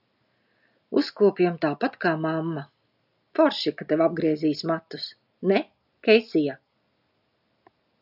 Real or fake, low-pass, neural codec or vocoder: real; 5.4 kHz; none